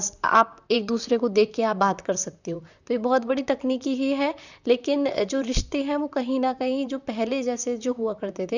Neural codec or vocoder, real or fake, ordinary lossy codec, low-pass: vocoder, 44.1 kHz, 128 mel bands, Pupu-Vocoder; fake; none; 7.2 kHz